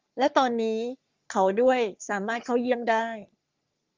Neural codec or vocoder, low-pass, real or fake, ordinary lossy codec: codec, 44.1 kHz, 3.4 kbps, Pupu-Codec; 7.2 kHz; fake; Opus, 32 kbps